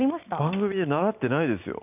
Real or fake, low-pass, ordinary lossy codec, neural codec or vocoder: real; 3.6 kHz; none; none